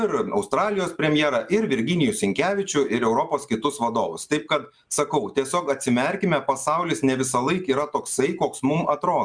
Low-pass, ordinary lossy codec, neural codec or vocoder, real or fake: 9.9 kHz; MP3, 96 kbps; none; real